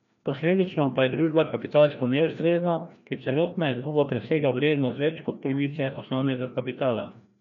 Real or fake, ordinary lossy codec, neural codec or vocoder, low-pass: fake; none; codec, 16 kHz, 1 kbps, FreqCodec, larger model; 7.2 kHz